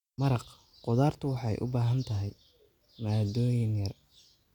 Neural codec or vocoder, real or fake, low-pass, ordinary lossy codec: none; real; 19.8 kHz; none